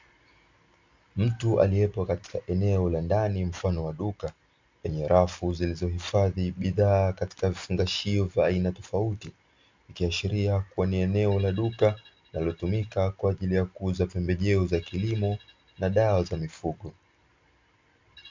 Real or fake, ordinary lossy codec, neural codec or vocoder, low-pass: real; MP3, 64 kbps; none; 7.2 kHz